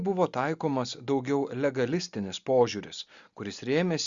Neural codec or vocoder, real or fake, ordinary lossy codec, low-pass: none; real; Opus, 64 kbps; 7.2 kHz